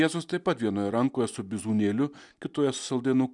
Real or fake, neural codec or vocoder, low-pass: real; none; 10.8 kHz